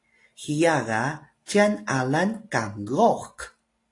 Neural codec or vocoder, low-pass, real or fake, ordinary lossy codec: none; 10.8 kHz; real; AAC, 48 kbps